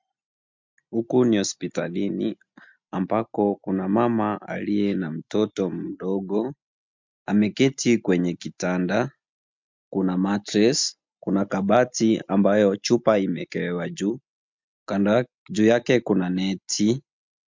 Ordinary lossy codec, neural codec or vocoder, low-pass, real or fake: MP3, 64 kbps; none; 7.2 kHz; real